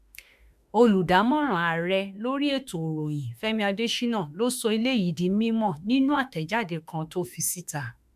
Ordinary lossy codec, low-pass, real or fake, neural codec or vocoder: MP3, 96 kbps; 14.4 kHz; fake; autoencoder, 48 kHz, 32 numbers a frame, DAC-VAE, trained on Japanese speech